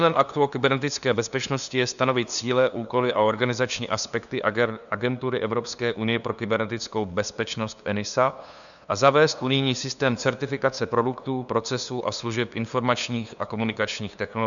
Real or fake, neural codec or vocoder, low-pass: fake; codec, 16 kHz, 2 kbps, FunCodec, trained on LibriTTS, 25 frames a second; 7.2 kHz